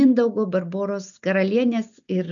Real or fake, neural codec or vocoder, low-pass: real; none; 7.2 kHz